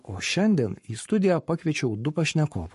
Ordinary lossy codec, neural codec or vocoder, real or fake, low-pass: MP3, 48 kbps; codec, 44.1 kHz, 7.8 kbps, Pupu-Codec; fake; 14.4 kHz